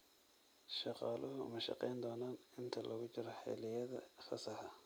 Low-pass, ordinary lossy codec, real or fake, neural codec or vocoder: none; none; real; none